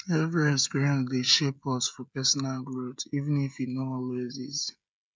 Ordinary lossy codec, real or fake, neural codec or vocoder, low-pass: none; fake; codec, 16 kHz, 16 kbps, FreqCodec, smaller model; none